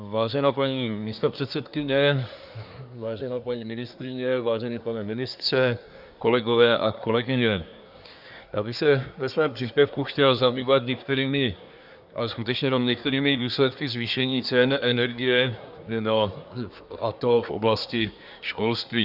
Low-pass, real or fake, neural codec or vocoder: 5.4 kHz; fake; codec, 24 kHz, 1 kbps, SNAC